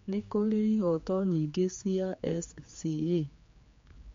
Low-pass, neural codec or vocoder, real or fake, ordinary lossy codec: 7.2 kHz; codec, 16 kHz, 4 kbps, X-Codec, HuBERT features, trained on general audio; fake; MP3, 48 kbps